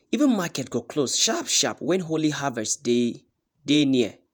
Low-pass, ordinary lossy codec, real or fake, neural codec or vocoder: none; none; fake; vocoder, 48 kHz, 128 mel bands, Vocos